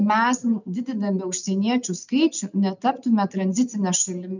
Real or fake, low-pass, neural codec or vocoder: real; 7.2 kHz; none